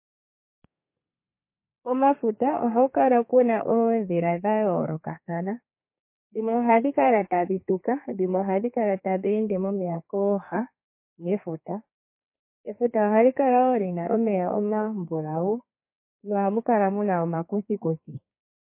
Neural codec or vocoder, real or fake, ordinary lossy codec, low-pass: codec, 32 kHz, 1.9 kbps, SNAC; fake; MP3, 24 kbps; 3.6 kHz